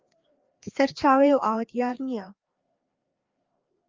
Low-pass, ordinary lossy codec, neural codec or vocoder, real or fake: 7.2 kHz; Opus, 24 kbps; codec, 16 kHz, 2 kbps, FreqCodec, larger model; fake